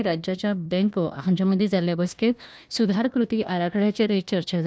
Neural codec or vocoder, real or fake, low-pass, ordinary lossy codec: codec, 16 kHz, 1 kbps, FunCodec, trained on Chinese and English, 50 frames a second; fake; none; none